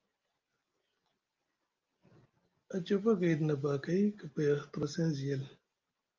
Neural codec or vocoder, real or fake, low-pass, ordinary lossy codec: none; real; 7.2 kHz; Opus, 32 kbps